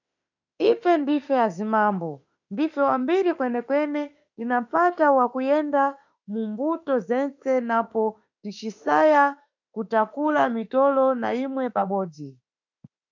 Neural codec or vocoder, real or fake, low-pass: autoencoder, 48 kHz, 32 numbers a frame, DAC-VAE, trained on Japanese speech; fake; 7.2 kHz